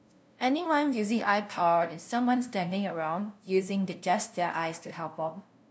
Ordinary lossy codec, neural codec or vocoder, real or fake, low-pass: none; codec, 16 kHz, 0.5 kbps, FunCodec, trained on LibriTTS, 25 frames a second; fake; none